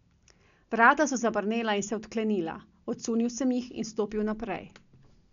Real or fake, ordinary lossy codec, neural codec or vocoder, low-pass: real; none; none; 7.2 kHz